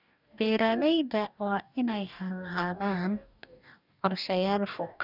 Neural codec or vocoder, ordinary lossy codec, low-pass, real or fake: codec, 44.1 kHz, 2.6 kbps, DAC; none; 5.4 kHz; fake